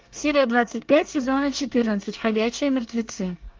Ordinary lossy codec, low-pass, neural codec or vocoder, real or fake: Opus, 24 kbps; 7.2 kHz; codec, 24 kHz, 1 kbps, SNAC; fake